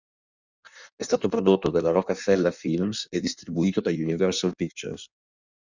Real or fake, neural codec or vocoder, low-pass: fake; codec, 16 kHz in and 24 kHz out, 1.1 kbps, FireRedTTS-2 codec; 7.2 kHz